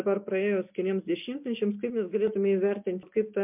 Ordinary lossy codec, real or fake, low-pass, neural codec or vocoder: MP3, 32 kbps; real; 3.6 kHz; none